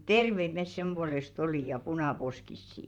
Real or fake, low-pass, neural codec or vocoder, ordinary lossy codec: fake; 19.8 kHz; vocoder, 44.1 kHz, 128 mel bands, Pupu-Vocoder; none